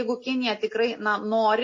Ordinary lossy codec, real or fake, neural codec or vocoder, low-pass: MP3, 32 kbps; real; none; 7.2 kHz